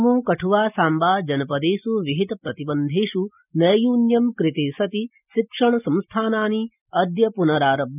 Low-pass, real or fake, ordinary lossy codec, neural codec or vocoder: 3.6 kHz; real; none; none